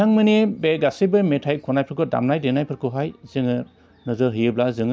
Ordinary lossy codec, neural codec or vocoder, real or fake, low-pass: none; none; real; none